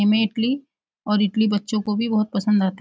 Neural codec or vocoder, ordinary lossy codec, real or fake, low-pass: none; none; real; none